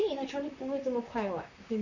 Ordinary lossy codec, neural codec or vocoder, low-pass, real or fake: none; vocoder, 44.1 kHz, 128 mel bands, Pupu-Vocoder; 7.2 kHz; fake